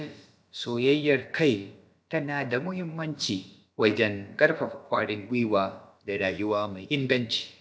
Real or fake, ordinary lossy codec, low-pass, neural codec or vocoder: fake; none; none; codec, 16 kHz, about 1 kbps, DyCAST, with the encoder's durations